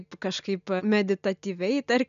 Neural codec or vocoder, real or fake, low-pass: none; real; 7.2 kHz